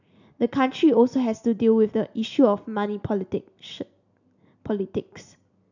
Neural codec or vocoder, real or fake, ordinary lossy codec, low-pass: none; real; none; 7.2 kHz